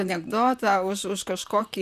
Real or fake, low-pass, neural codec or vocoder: fake; 14.4 kHz; vocoder, 44.1 kHz, 128 mel bands, Pupu-Vocoder